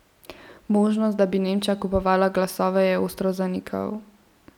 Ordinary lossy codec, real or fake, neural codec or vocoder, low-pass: none; real; none; 19.8 kHz